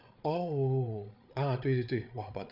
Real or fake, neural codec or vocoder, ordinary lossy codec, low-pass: fake; codec, 16 kHz, 8 kbps, FreqCodec, larger model; none; 5.4 kHz